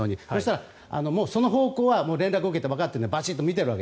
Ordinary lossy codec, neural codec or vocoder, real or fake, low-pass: none; none; real; none